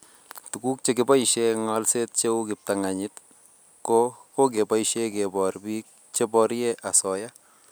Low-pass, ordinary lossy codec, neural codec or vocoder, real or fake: none; none; none; real